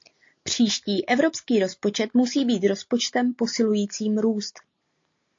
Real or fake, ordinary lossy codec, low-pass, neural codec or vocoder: real; AAC, 48 kbps; 7.2 kHz; none